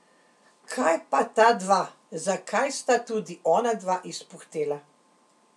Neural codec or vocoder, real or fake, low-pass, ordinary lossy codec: none; real; none; none